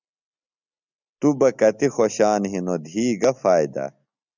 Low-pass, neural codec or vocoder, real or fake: 7.2 kHz; none; real